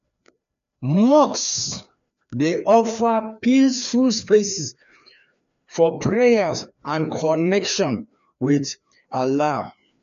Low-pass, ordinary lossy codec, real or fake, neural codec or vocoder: 7.2 kHz; none; fake; codec, 16 kHz, 2 kbps, FreqCodec, larger model